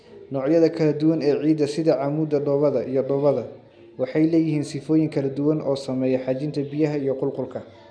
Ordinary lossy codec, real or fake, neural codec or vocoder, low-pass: none; real; none; 9.9 kHz